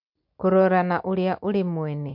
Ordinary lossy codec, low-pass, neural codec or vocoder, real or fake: MP3, 48 kbps; 5.4 kHz; none; real